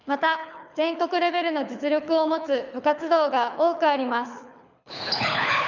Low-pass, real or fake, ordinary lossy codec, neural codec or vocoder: 7.2 kHz; fake; none; codec, 24 kHz, 6 kbps, HILCodec